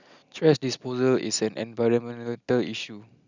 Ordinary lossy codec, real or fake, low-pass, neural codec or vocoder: none; real; 7.2 kHz; none